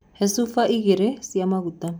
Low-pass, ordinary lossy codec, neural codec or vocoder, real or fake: none; none; none; real